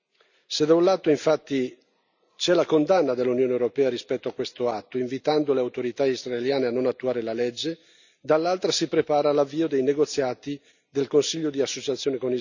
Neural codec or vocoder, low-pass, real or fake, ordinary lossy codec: none; 7.2 kHz; real; none